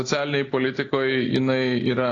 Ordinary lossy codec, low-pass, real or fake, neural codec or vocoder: AAC, 32 kbps; 7.2 kHz; real; none